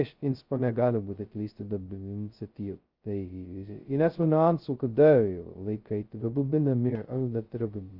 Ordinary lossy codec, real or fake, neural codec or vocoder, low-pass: Opus, 24 kbps; fake; codec, 16 kHz, 0.2 kbps, FocalCodec; 5.4 kHz